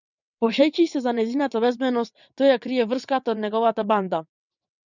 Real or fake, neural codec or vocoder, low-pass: fake; vocoder, 22.05 kHz, 80 mel bands, WaveNeXt; 7.2 kHz